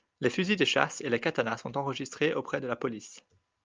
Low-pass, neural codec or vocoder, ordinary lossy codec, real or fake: 7.2 kHz; none; Opus, 32 kbps; real